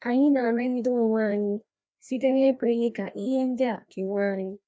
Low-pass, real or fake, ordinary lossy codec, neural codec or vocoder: none; fake; none; codec, 16 kHz, 1 kbps, FreqCodec, larger model